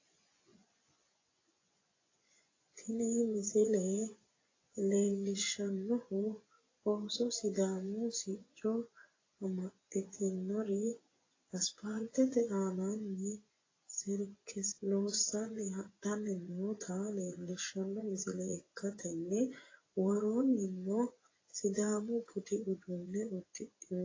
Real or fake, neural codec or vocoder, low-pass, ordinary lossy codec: fake; vocoder, 24 kHz, 100 mel bands, Vocos; 7.2 kHz; AAC, 32 kbps